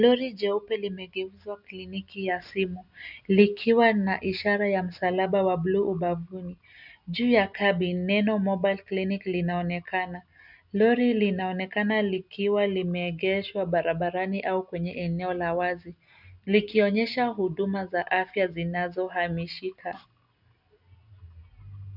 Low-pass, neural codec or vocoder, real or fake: 5.4 kHz; none; real